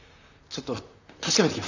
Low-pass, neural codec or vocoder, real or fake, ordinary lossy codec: 7.2 kHz; vocoder, 44.1 kHz, 128 mel bands, Pupu-Vocoder; fake; none